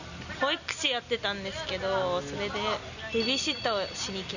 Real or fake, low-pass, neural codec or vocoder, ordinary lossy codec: real; 7.2 kHz; none; none